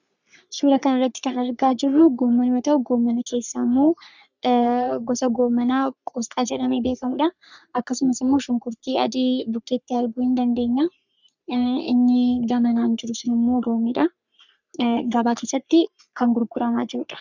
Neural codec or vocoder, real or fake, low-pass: codec, 44.1 kHz, 3.4 kbps, Pupu-Codec; fake; 7.2 kHz